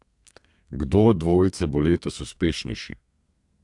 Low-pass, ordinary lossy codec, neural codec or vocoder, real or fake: 10.8 kHz; AAC, 64 kbps; codec, 32 kHz, 1.9 kbps, SNAC; fake